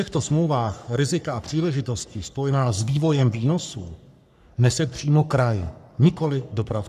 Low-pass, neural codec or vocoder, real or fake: 14.4 kHz; codec, 44.1 kHz, 3.4 kbps, Pupu-Codec; fake